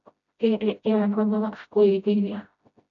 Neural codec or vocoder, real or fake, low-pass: codec, 16 kHz, 0.5 kbps, FreqCodec, smaller model; fake; 7.2 kHz